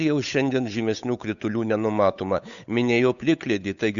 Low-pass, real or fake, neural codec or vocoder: 7.2 kHz; fake; codec, 16 kHz, 8 kbps, FunCodec, trained on Chinese and English, 25 frames a second